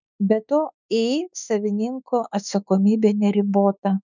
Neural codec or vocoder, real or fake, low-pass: autoencoder, 48 kHz, 32 numbers a frame, DAC-VAE, trained on Japanese speech; fake; 7.2 kHz